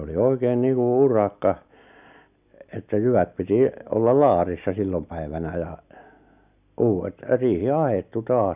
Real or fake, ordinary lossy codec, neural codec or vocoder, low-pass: real; none; none; 3.6 kHz